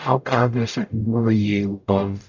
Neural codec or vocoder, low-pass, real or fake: codec, 44.1 kHz, 0.9 kbps, DAC; 7.2 kHz; fake